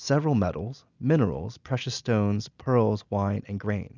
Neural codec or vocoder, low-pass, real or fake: none; 7.2 kHz; real